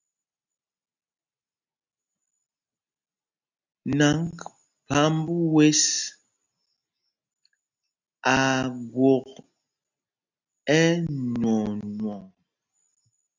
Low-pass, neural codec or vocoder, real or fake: 7.2 kHz; none; real